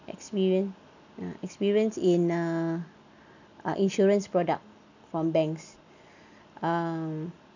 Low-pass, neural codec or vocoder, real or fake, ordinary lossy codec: 7.2 kHz; none; real; none